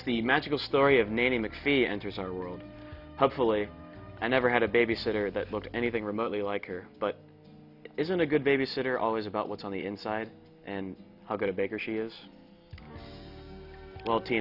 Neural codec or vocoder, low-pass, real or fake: none; 5.4 kHz; real